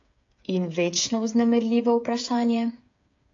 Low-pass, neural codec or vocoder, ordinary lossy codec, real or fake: 7.2 kHz; codec, 16 kHz, 8 kbps, FreqCodec, smaller model; AAC, 48 kbps; fake